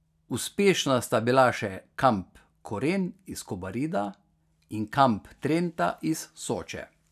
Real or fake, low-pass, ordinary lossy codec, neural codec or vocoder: real; 14.4 kHz; none; none